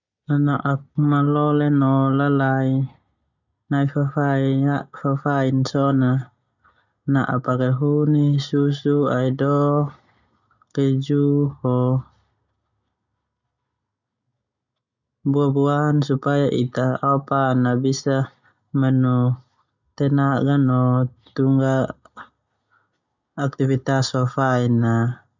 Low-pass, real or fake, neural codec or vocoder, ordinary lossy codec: 7.2 kHz; real; none; none